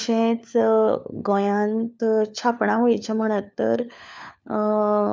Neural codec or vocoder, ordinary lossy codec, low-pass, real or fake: codec, 16 kHz, 4 kbps, FunCodec, trained on LibriTTS, 50 frames a second; none; none; fake